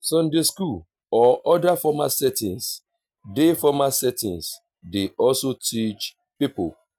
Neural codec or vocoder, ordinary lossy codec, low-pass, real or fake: none; none; none; real